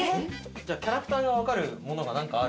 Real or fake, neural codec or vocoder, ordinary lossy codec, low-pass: real; none; none; none